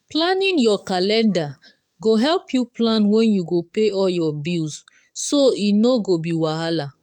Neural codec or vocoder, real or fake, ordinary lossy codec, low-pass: codec, 44.1 kHz, 7.8 kbps, DAC; fake; none; 19.8 kHz